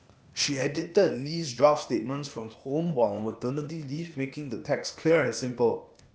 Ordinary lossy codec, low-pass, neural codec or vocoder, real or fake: none; none; codec, 16 kHz, 0.8 kbps, ZipCodec; fake